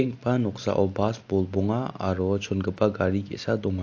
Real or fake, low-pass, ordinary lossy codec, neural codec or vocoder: real; 7.2 kHz; AAC, 48 kbps; none